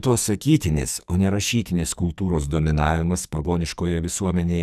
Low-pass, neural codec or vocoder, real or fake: 14.4 kHz; codec, 44.1 kHz, 2.6 kbps, SNAC; fake